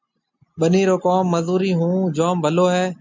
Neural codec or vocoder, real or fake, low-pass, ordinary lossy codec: none; real; 7.2 kHz; MP3, 64 kbps